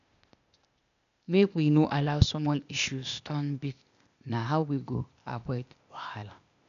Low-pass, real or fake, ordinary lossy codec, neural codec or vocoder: 7.2 kHz; fake; none; codec, 16 kHz, 0.8 kbps, ZipCodec